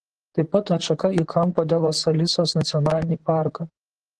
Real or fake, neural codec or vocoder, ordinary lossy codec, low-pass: fake; vocoder, 44.1 kHz, 128 mel bands, Pupu-Vocoder; Opus, 16 kbps; 10.8 kHz